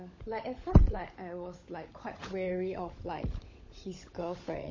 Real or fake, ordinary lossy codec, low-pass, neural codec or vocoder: fake; MP3, 32 kbps; 7.2 kHz; codec, 16 kHz, 16 kbps, FunCodec, trained on LibriTTS, 50 frames a second